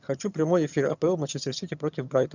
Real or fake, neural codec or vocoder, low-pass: fake; vocoder, 22.05 kHz, 80 mel bands, HiFi-GAN; 7.2 kHz